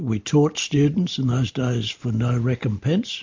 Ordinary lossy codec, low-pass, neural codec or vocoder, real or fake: MP3, 48 kbps; 7.2 kHz; none; real